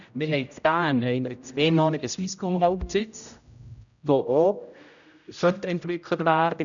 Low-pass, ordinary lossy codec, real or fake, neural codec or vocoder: 7.2 kHz; none; fake; codec, 16 kHz, 0.5 kbps, X-Codec, HuBERT features, trained on general audio